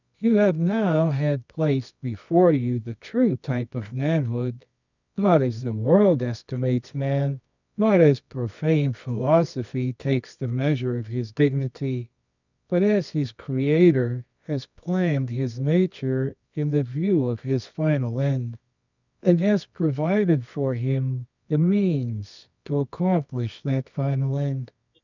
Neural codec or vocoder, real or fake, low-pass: codec, 24 kHz, 0.9 kbps, WavTokenizer, medium music audio release; fake; 7.2 kHz